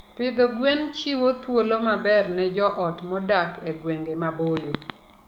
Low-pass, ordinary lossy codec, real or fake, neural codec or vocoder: 19.8 kHz; none; fake; codec, 44.1 kHz, 7.8 kbps, DAC